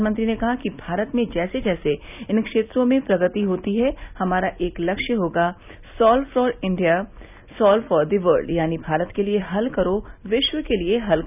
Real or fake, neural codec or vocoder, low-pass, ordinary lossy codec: real; none; 3.6 kHz; none